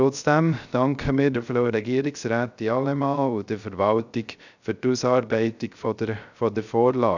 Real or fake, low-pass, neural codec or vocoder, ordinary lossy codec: fake; 7.2 kHz; codec, 16 kHz, 0.3 kbps, FocalCodec; none